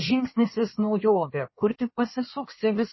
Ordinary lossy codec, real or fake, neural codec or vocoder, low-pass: MP3, 24 kbps; fake; codec, 16 kHz in and 24 kHz out, 1.1 kbps, FireRedTTS-2 codec; 7.2 kHz